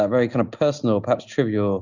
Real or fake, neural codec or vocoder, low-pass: real; none; 7.2 kHz